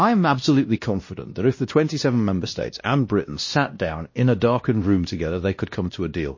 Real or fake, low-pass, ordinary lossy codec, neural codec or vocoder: fake; 7.2 kHz; MP3, 32 kbps; codec, 16 kHz, 1 kbps, X-Codec, WavLM features, trained on Multilingual LibriSpeech